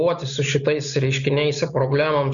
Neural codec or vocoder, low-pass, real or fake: none; 7.2 kHz; real